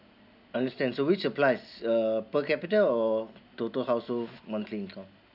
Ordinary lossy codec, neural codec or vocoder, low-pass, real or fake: none; none; 5.4 kHz; real